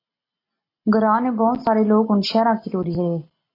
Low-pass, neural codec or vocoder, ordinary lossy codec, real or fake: 5.4 kHz; none; AAC, 24 kbps; real